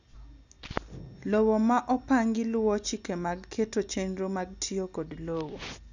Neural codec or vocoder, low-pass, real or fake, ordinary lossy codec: none; 7.2 kHz; real; none